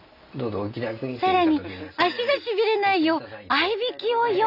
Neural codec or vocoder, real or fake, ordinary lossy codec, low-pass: none; real; none; 5.4 kHz